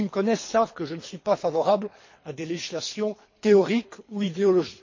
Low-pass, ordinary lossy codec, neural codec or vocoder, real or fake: 7.2 kHz; MP3, 32 kbps; codec, 24 kHz, 3 kbps, HILCodec; fake